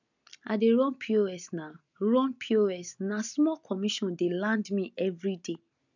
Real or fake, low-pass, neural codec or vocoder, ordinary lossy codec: real; 7.2 kHz; none; none